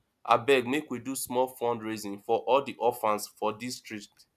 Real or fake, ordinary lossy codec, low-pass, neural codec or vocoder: real; none; 14.4 kHz; none